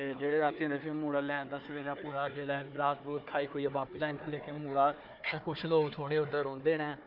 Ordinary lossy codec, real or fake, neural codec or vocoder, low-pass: none; fake; codec, 16 kHz, 4 kbps, FunCodec, trained on Chinese and English, 50 frames a second; 5.4 kHz